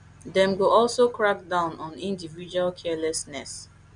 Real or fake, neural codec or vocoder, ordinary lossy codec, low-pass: real; none; none; 9.9 kHz